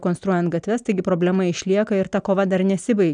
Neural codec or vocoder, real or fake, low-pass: none; real; 9.9 kHz